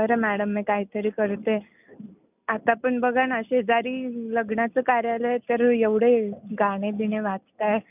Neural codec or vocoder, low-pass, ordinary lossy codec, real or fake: none; 3.6 kHz; none; real